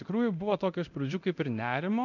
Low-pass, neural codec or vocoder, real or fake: 7.2 kHz; codec, 24 kHz, 0.9 kbps, DualCodec; fake